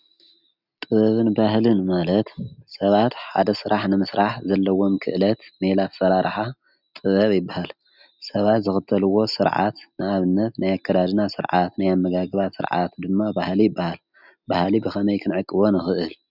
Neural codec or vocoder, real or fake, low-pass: none; real; 5.4 kHz